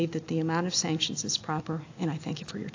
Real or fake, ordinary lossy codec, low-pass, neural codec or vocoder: real; AAC, 48 kbps; 7.2 kHz; none